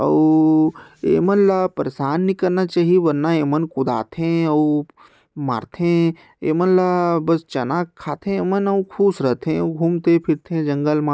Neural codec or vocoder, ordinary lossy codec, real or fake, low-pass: none; none; real; none